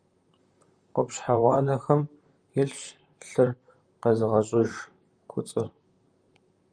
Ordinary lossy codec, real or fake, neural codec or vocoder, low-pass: Opus, 32 kbps; fake; vocoder, 44.1 kHz, 128 mel bands, Pupu-Vocoder; 9.9 kHz